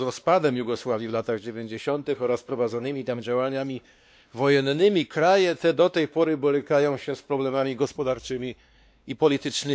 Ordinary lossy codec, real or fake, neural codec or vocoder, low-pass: none; fake; codec, 16 kHz, 2 kbps, X-Codec, WavLM features, trained on Multilingual LibriSpeech; none